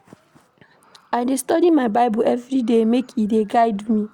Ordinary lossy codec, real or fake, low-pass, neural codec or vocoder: Opus, 64 kbps; real; 19.8 kHz; none